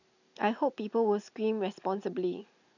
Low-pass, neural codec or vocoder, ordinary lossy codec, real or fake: 7.2 kHz; none; none; real